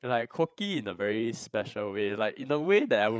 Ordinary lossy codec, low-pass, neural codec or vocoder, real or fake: none; none; codec, 16 kHz, 8 kbps, FreqCodec, larger model; fake